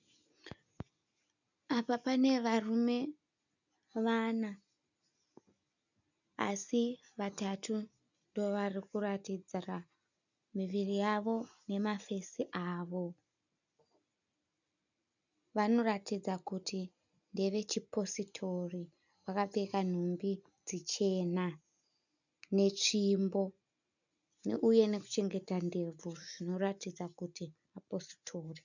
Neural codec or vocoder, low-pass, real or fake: none; 7.2 kHz; real